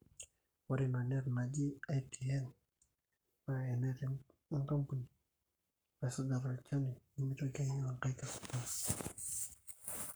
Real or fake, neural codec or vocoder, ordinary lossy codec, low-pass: fake; codec, 44.1 kHz, 7.8 kbps, Pupu-Codec; none; none